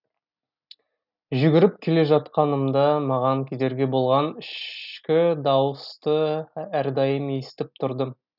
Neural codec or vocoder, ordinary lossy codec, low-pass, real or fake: none; none; 5.4 kHz; real